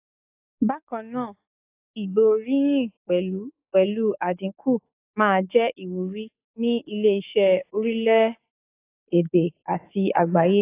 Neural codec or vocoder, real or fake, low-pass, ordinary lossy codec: codec, 44.1 kHz, 7.8 kbps, DAC; fake; 3.6 kHz; AAC, 24 kbps